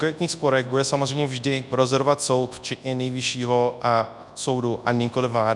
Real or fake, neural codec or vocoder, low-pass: fake; codec, 24 kHz, 0.9 kbps, WavTokenizer, large speech release; 10.8 kHz